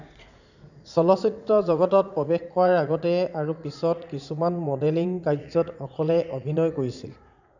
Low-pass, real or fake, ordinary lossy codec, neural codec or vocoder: 7.2 kHz; fake; none; vocoder, 44.1 kHz, 80 mel bands, Vocos